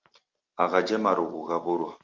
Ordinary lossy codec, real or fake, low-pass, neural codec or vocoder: Opus, 32 kbps; real; 7.2 kHz; none